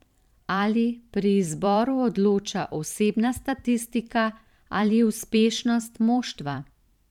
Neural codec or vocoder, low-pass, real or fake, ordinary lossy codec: vocoder, 44.1 kHz, 128 mel bands every 512 samples, BigVGAN v2; 19.8 kHz; fake; none